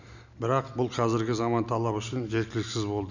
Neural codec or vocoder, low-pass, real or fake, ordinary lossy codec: none; 7.2 kHz; real; none